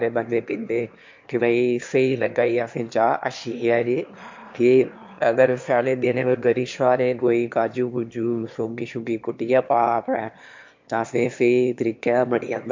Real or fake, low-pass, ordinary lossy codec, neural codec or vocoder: fake; 7.2 kHz; MP3, 48 kbps; autoencoder, 22.05 kHz, a latent of 192 numbers a frame, VITS, trained on one speaker